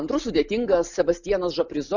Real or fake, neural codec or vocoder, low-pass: real; none; 7.2 kHz